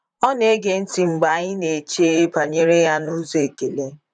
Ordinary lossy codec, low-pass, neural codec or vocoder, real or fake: none; 9.9 kHz; vocoder, 44.1 kHz, 128 mel bands, Pupu-Vocoder; fake